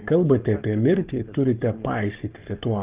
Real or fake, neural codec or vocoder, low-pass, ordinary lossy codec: fake; codec, 44.1 kHz, 7.8 kbps, Pupu-Codec; 3.6 kHz; Opus, 24 kbps